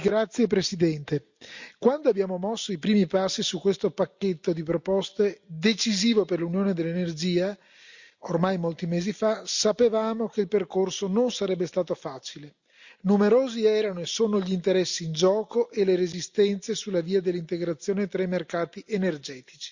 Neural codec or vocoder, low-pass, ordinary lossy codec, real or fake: none; 7.2 kHz; Opus, 64 kbps; real